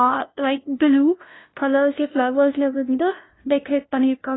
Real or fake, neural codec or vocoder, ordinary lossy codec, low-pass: fake; codec, 16 kHz, 0.5 kbps, FunCodec, trained on LibriTTS, 25 frames a second; AAC, 16 kbps; 7.2 kHz